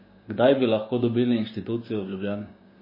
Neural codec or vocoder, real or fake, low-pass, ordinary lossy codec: codec, 16 kHz, 6 kbps, DAC; fake; 5.4 kHz; MP3, 24 kbps